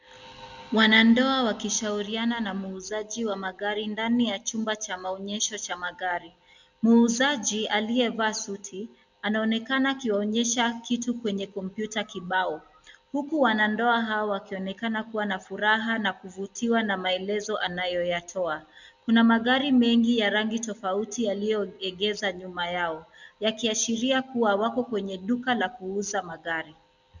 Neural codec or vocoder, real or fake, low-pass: none; real; 7.2 kHz